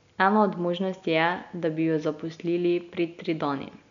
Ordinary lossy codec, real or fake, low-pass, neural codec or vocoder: none; real; 7.2 kHz; none